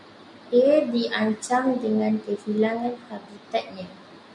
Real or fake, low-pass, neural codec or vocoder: real; 10.8 kHz; none